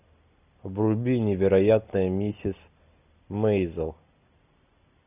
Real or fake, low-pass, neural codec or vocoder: real; 3.6 kHz; none